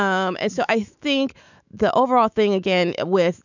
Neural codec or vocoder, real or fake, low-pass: autoencoder, 48 kHz, 128 numbers a frame, DAC-VAE, trained on Japanese speech; fake; 7.2 kHz